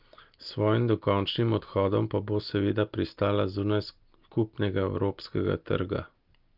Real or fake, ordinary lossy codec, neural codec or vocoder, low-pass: real; Opus, 32 kbps; none; 5.4 kHz